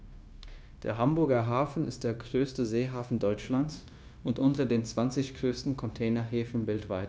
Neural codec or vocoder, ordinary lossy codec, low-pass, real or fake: codec, 16 kHz, 0.9 kbps, LongCat-Audio-Codec; none; none; fake